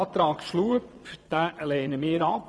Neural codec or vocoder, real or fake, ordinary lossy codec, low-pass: vocoder, 22.05 kHz, 80 mel bands, Vocos; fake; none; none